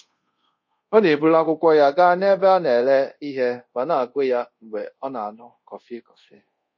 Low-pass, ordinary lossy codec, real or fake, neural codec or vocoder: 7.2 kHz; MP3, 32 kbps; fake; codec, 24 kHz, 0.5 kbps, DualCodec